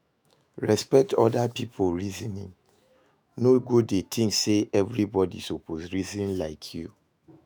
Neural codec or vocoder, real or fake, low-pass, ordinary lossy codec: autoencoder, 48 kHz, 128 numbers a frame, DAC-VAE, trained on Japanese speech; fake; none; none